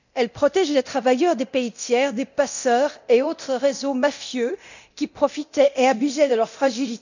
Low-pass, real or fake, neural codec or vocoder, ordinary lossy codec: 7.2 kHz; fake; codec, 24 kHz, 0.9 kbps, DualCodec; none